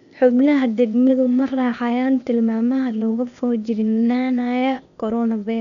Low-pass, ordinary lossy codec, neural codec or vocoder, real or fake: 7.2 kHz; none; codec, 16 kHz, 0.8 kbps, ZipCodec; fake